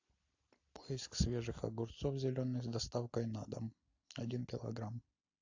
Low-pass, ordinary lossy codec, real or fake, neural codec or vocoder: 7.2 kHz; AAC, 48 kbps; real; none